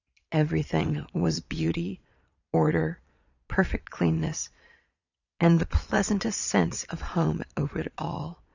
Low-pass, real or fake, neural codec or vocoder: 7.2 kHz; fake; vocoder, 22.05 kHz, 80 mel bands, Vocos